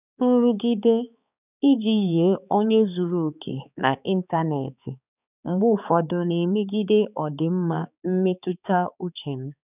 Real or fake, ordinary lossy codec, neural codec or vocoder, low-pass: fake; none; codec, 16 kHz, 4 kbps, X-Codec, HuBERT features, trained on balanced general audio; 3.6 kHz